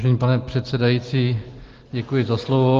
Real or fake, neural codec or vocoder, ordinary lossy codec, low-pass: real; none; Opus, 16 kbps; 7.2 kHz